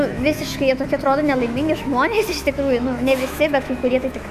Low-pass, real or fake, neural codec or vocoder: 14.4 kHz; fake; autoencoder, 48 kHz, 128 numbers a frame, DAC-VAE, trained on Japanese speech